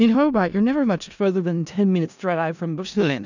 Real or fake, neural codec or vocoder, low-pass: fake; codec, 16 kHz in and 24 kHz out, 0.4 kbps, LongCat-Audio-Codec, four codebook decoder; 7.2 kHz